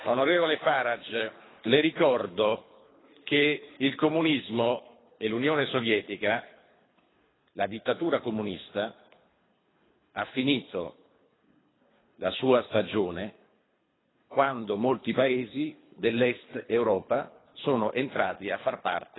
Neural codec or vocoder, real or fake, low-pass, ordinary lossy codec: codec, 24 kHz, 3 kbps, HILCodec; fake; 7.2 kHz; AAC, 16 kbps